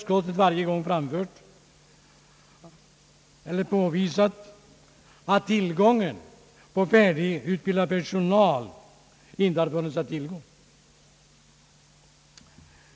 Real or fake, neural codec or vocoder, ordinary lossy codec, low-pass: real; none; none; none